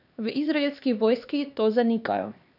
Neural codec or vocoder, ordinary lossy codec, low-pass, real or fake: codec, 16 kHz, 2 kbps, X-Codec, HuBERT features, trained on LibriSpeech; none; 5.4 kHz; fake